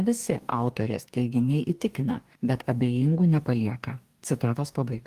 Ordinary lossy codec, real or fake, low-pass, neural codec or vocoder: Opus, 32 kbps; fake; 14.4 kHz; codec, 44.1 kHz, 2.6 kbps, DAC